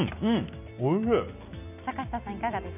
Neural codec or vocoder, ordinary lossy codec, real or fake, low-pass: none; none; real; 3.6 kHz